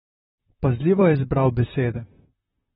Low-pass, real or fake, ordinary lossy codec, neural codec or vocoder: 19.8 kHz; fake; AAC, 16 kbps; vocoder, 44.1 kHz, 128 mel bands, Pupu-Vocoder